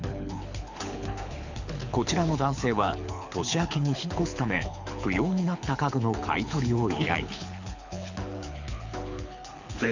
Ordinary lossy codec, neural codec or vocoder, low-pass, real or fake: none; codec, 24 kHz, 6 kbps, HILCodec; 7.2 kHz; fake